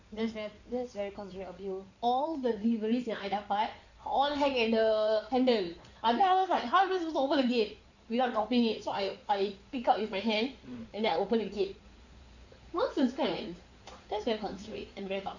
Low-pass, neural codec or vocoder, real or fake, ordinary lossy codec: 7.2 kHz; codec, 16 kHz in and 24 kHz out, 2.2 kbps, FireRedTTS-2 codec; fake; none